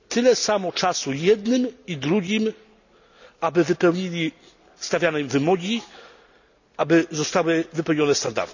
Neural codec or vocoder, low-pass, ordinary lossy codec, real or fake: none; 7.2 kHz; none; real